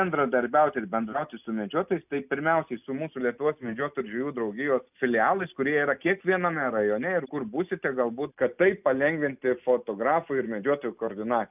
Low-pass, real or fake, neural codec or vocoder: 3.6 kHz; real; none